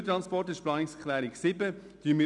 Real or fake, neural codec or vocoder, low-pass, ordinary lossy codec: real; none; 10.8 kHz; none